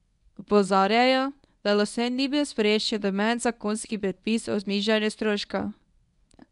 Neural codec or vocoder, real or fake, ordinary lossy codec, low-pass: codec, 24 kHz, 0.9 kbps, WavTokenizer, medium speech release version 1; fake; none; 10.8 kHz